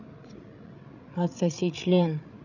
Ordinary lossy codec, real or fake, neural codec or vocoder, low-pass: none; fake; codec, 16 kHz, 16 kbps, FreqCodec, larger model; 7.2 kHz